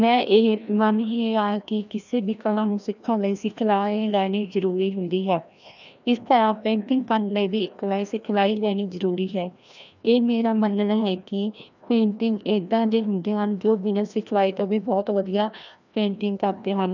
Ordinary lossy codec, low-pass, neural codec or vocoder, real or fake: none; 7.2 kHz; codec, 16 kHz, 1 kbps, FreqCodec, larger model; fake